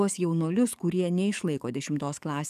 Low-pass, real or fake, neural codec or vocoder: 14.4 kHz; fake; codec, 44.1 kHz, 7.8 kbps, DAC